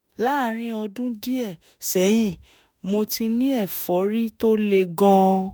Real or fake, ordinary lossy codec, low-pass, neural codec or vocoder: fake; none; none; autoencoder, 48 kHz, 32 numbers a frame, DAC-VAE, trained on Japanese speech